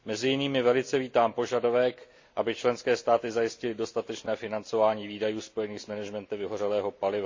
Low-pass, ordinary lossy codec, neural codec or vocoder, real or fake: 7.2 kHz; MP3, 48 kbps; none; real